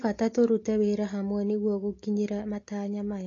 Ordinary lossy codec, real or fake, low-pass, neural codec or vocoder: AAC, 32 kbps; real; 7.2 kHz; none